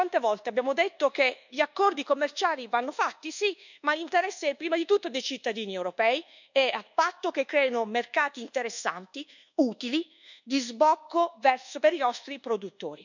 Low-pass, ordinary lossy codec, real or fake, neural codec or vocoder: 7.2 kHz; none; fake; codec, 24 kHz, 1.2 kbps, DualCodec